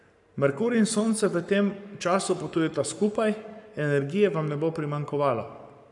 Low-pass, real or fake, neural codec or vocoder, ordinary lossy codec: 10.8 kHz; fake; codec, 44.1 kHz, 7.8 kbps, Pupu-Codec; none